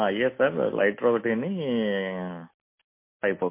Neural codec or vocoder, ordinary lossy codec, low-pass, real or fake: none; MP3, 24 kbps; 3.6 kHz; real